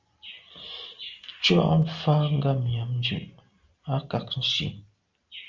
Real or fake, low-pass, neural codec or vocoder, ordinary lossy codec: real; 7.2 kHz; none; Opus, 32 kbps